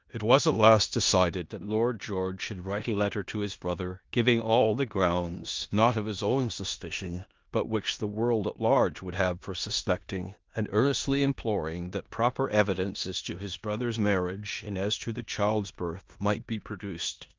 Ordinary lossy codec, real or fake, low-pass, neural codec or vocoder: Opus, 24 kbps; fake; 7.2 kHz; codec, 16 kHz in and 24 kHz out, 0.9 kbps, LongCat-Audio-Codec, four codebook decoder